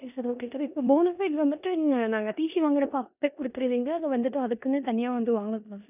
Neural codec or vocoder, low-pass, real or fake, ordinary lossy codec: codec, 16 kHz in and 24 kHz out, 0.9 kbps, LongCat-Audio-Codec, four codebook decoder; 3.6 kHz; fake; none